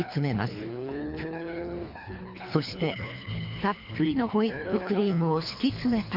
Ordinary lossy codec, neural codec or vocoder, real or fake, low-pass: MP3, 48 kbps; codec, 24 kHz, 3 kbps, HILCodec; fake; 5.4 kHz